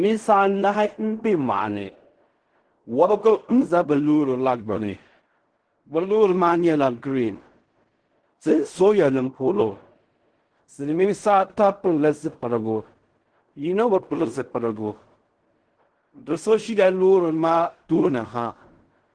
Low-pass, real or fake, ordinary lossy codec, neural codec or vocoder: 9.9 kHz; fake; Opus, 16 kbps; codec, 16 kHz in and 24 kHz out, 0.4 kbps, LongCat-Audio-Codec, fine tuned four codebook decoder